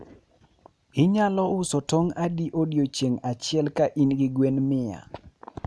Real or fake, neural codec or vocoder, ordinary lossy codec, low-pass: real; none; none; none